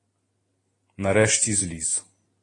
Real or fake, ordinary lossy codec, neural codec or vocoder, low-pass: real; AAC, 32 kbps; none; 10.8 kHz